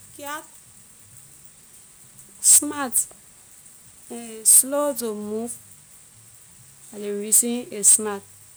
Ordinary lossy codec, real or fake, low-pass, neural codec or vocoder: none; real; none; none